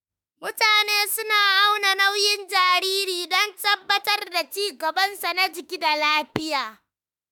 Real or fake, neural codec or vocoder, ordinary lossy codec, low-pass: fake; autoencoder, 48 kHz, 32 numbers a frame, DAC-VAE, trained on Japanese speech; none; none